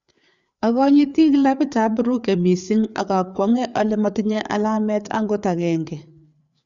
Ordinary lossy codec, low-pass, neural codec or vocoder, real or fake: none; 7.2 kHz; codec, 16 kHz, 4 kbps, FreqCodec, larger model; fake